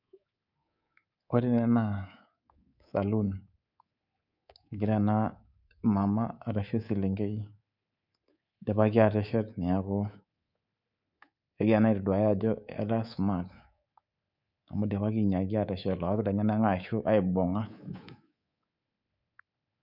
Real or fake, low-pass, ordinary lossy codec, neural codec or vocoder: fake; 5.4 kHz; none; codec, 24 kHz, 3.1 kbps, DualCodec